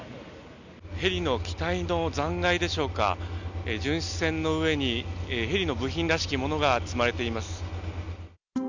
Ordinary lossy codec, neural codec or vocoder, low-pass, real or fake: none; none; 7.2 kHz; real